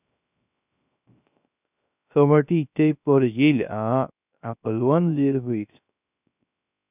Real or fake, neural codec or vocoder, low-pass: fake; codec, 16 kHz, 0.3 kbps, FocalCodec; 3.6 kHz